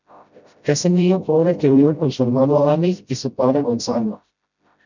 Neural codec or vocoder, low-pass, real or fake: codec, 16 kHz, 0.5 kbps, FreqCodec, smaller model; 7.2 kHz; fake